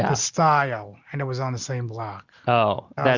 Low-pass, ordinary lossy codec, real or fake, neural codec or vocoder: 7.2 kHz; Opus, 64 kbps; real; none